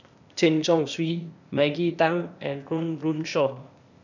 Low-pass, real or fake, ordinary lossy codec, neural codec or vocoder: 7.2 kHz; fake; none; codec, 16 kHz, 0.8 kbps, ZipCodec